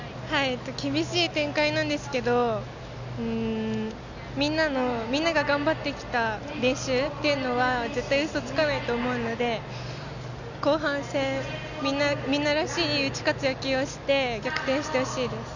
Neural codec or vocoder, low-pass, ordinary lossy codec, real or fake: none; 7.2 kHz; none; real